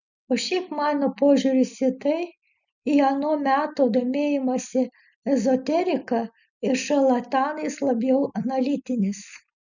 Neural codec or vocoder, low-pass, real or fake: none; 7.2 kHz; real